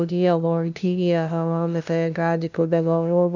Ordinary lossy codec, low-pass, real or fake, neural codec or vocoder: none; 7.2 kHz; fake; codec, 16 kHz, 0.5 kbps, FunCodec, trained on LibriTTS, 25 frames a second